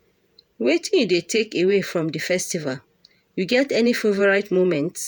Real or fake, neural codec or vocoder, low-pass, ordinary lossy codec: fake; vocoder, 48 kHz, 128 mel bands, Vocos; none; none